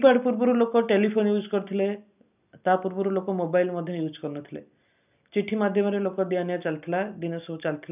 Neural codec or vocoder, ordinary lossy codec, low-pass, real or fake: none; none; 3.6 kHz; real